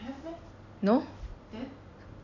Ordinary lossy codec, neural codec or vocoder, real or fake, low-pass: none; none; real; 7.2 kHz